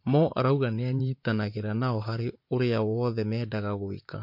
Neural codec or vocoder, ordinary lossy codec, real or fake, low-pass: vocoder, 44.1 kHz, 128 mel bands, Pupu-Vocoder; MP3, 32 kbps; fake; 5.4 kHz